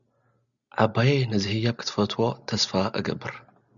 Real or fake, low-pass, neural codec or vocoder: real; 7.2 kHz; none